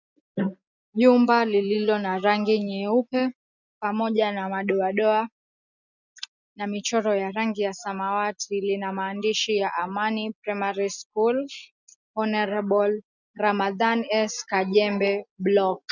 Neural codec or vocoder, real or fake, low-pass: none; real; 7.2 kHz